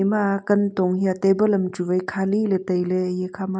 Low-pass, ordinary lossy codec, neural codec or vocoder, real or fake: none; none; none; real